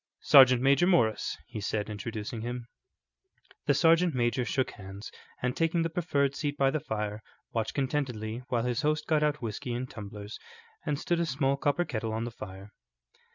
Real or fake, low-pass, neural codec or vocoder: real; 7.2 kHz; none